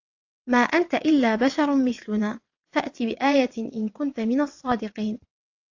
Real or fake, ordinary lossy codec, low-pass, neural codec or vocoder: fake; AAC, 48 kbps; 7.2 kHz; vocoder, 22.05 kHz, 80 mel bands, WaveNeXt